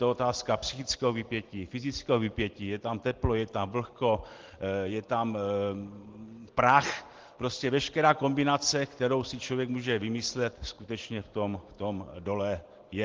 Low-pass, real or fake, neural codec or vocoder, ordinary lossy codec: 7.2 kHz; real; none; Opus, 16 kbps